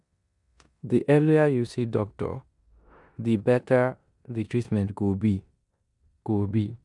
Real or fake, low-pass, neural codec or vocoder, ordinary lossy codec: fake; 10.8 kHz; codec, 16 kHz in and 24 kHz out, 0.9 kbps, LongCat-Audio-Codec, four codebook decoder; MP3, 96 kbps